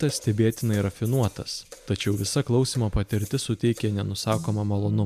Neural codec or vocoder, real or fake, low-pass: none; real; 14.4 kHz